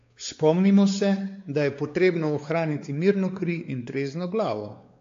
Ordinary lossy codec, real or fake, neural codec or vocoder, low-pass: AAC, 48 kbps; fake; codec, 16 kHz, 4 kbps, X-Codec, WavLM features, trained on Multilingual LibriSpeech; 7.2 kHz